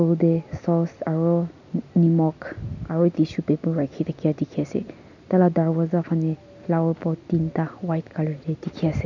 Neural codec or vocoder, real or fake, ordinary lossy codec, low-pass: none; real; none; 7.2 kHz